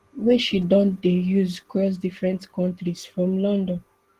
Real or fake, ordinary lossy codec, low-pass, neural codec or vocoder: real; Opus, 16 kbps; 14.4 kHz; none